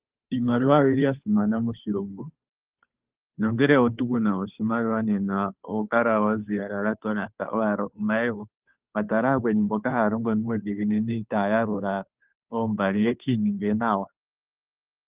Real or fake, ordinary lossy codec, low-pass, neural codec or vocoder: fake; Opus, 24 kbps; 3.6 kHz; codec, 16 kHz, 2 kbps, FunCodec, trained on Chinese and English, 25 frames a second